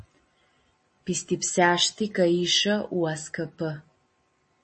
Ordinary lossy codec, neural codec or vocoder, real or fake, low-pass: MP3, 32 kbps; none; real; 9.9 kHz